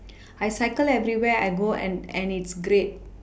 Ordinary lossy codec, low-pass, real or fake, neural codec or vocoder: none; none; real; none